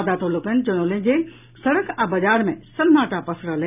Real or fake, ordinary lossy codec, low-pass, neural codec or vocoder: real; none; 3.6 kHz; none